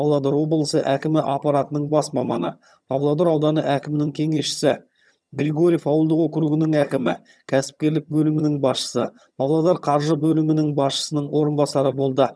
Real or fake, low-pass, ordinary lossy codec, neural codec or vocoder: fake; none; none; vocoder, 22.05 kHz, 80 mel bands, HiFi-GAN